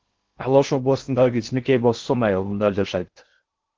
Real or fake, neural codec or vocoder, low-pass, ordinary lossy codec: fake; codec, 16 kHz in and 24 kHz out, 0.6 kbps, FocalCodec, streaming, 2048 codes; 7.2 kHz; Opus, 16 kbps